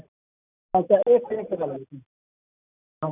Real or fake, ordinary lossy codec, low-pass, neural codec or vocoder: real; none; 3.6 kHz; none